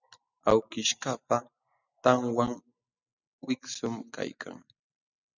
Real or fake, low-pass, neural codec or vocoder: real; 7.2 kHz; none